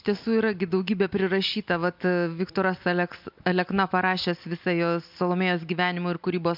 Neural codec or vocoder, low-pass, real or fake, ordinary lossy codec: none; 5.4 kHz; real; MP3, 48 kbps